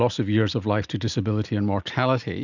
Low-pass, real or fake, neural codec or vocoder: 7.2 kHz; real; none